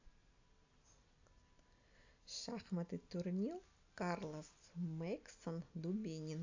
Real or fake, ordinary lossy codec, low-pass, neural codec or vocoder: real; AAC, 48 kbps; 7.2 kHz; none